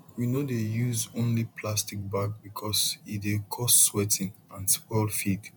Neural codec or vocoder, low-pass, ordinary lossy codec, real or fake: vocoder, 48 kHz, 128 mel bands, Vocos; none; none; fake